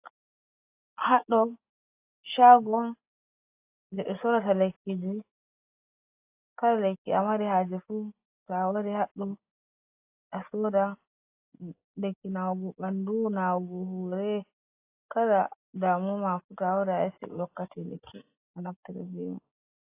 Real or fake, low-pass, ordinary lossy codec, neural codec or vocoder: real; 3.6 kHz; AAC, 24 kbps; none